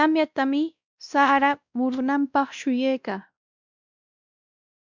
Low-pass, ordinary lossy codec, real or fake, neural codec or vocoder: 7.2 kHz; MP3, 64 kbps; fake; codec, 16 kHz, 1 kbps, X-Codec, WavLM features, trained on Multilingual LibriSpeech